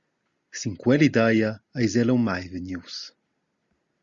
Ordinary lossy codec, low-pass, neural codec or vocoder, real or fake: Opus, 64 kbps; 7.2 kHz; none; real